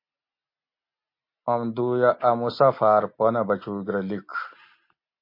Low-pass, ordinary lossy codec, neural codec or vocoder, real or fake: 5.4 kHz; MP3, 32 kbps; none; real